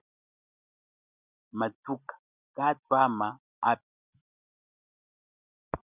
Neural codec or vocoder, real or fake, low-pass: none; real; 3.6 kHz